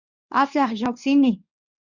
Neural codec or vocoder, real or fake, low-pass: codec, 24 kHz, 0.9 kbps, WavTokenizer, medium speech release version 1; fake; 7.2 kHz